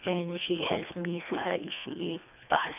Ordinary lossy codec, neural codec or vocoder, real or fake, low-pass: none; codec, 24 kHz, 1.5 kbps, HILCodec; fake; 3.6 kHz